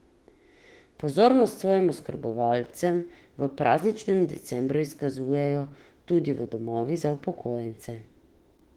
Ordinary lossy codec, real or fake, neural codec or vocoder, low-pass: Opus, 16 kbps; fake; autoencoder, 48 kHz, 32 numbers a frame, DAC-VAE, trained on Japanese speech; 19.8 kHz